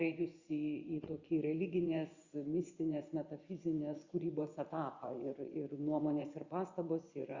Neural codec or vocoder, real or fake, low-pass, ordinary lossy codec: vocoder, 24 kHz, 100 mel bands, Vocos; fake; 7.2 kHz; Opus, 64 kbps